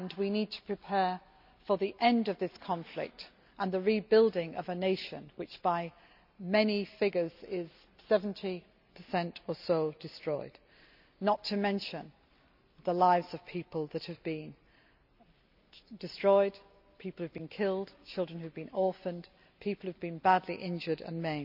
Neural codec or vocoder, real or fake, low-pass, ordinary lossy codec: none; real; 5.4 kHz; none